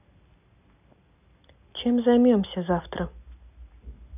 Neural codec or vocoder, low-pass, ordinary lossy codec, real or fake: none; 3.6 kHz; none; real